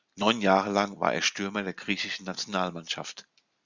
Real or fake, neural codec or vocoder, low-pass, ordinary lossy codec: real; none; 7.2 kHz; Opus, 64 kbps